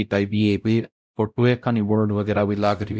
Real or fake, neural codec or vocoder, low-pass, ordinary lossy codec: fake; codec, 16 kHz, 0.5 kbps, X-Codec, WavLM features, trained on Multilingual LibriSpeech; none; none